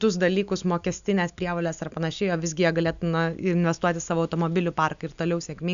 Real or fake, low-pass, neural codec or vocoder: real; 7.2 kHz; none